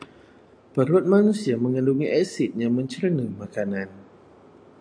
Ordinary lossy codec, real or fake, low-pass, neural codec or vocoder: AAC, 64 kbps; real; 9.9 kHz; none